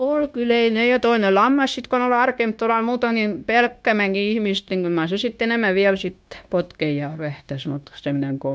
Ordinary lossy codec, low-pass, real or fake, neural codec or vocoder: none; none; fake; codec, 16 kHz, 0.9 kbps, LongCat-Audio-Codec